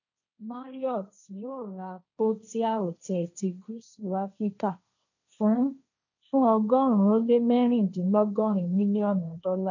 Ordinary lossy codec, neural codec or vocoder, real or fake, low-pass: none; codec, 16 kHz, 1.1 kbps, Voila-Tokenizer; fake; 7.2 kHz